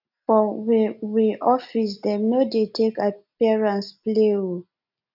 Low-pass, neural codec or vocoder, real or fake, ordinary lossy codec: 5.4 kHz; none; real; none